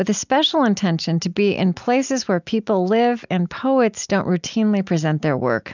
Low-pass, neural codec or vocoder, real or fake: 7.2 kHz; none; real